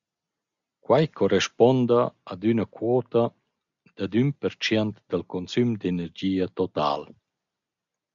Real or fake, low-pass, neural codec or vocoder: real; 7.2 kHz; none